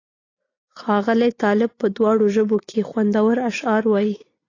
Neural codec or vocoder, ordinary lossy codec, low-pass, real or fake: none; AAC, 32 kbps; 7.2 kHz; real